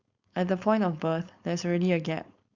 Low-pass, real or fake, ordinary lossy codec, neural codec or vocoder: 7.2 kHz; fake; Opus, 64 kbps; codec, 16 kHz, 4.8 kbps, FACodec